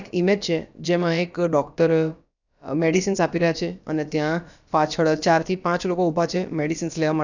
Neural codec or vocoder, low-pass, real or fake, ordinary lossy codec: codec, 16 kHz, about 1 kbps, DyCAST, with the encoder's durations; 7.2 kHz; fake; none